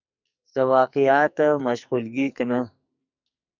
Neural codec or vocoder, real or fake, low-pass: codec, 44.1 kHz, 2.6 kbps, SNAC; fake; 7.2 kHz